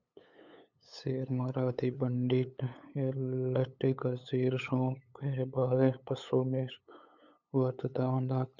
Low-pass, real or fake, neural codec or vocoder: 7.2 kHz; fake; codec, 16 kHz, 8 kbps, FunCodec, trained on LibriTTS, 25 frames a second